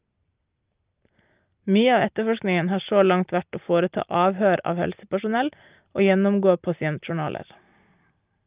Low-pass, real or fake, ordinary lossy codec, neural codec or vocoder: 3.6 kHz; real; Opus, 24 kbps; none